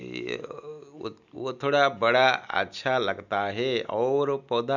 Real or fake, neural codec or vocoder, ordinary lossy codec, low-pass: real; none; none; 7.2 kHz